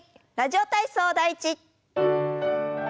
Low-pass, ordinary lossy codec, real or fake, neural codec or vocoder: none; none; real; none